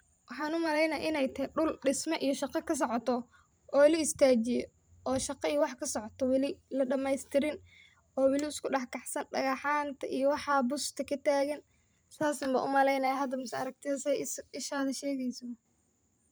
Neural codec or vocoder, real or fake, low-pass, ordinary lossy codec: vocoder, 44.1 kHz, 128 mel bands every 512 samples, BigVGAN v2; fake; none; none